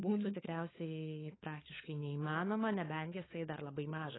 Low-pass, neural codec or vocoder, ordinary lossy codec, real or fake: 7.2 kHz; codec, 16 kHz, 4 kbps, FunCodec, trained on LibriTTS, 50 frames a second; AAC, 16 kbps; fake